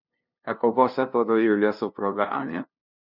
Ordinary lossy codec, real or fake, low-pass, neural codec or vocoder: none; fake; 5.4 kHz; codec, 16 kHz, 0.5 kbps, FunCodec, trained on LibriTTS, 25 frames a second